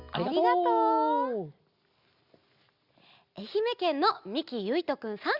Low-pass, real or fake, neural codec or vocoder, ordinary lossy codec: 5.4 kHz; real; none; Opus, 64 kbps